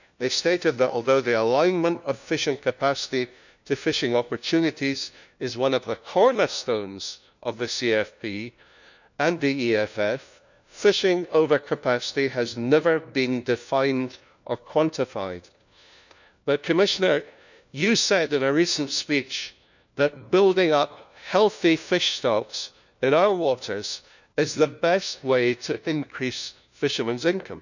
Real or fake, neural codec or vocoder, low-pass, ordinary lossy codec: fake; codec, 16 kHz, 1 kbps, FunCodec, trained on LibriTTS, 50 frames a second; 7.2 kHz; none